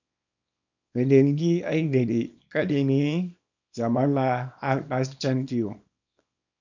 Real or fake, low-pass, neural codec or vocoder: fake; 7.2 kHz; codec, 24 kHz, 0.9 kbps, WavTokenizer, small release